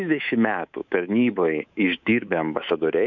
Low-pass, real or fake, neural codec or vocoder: 7.2 kHz; fake; codec, 24 kHz, 3.1 kbps, DualCodec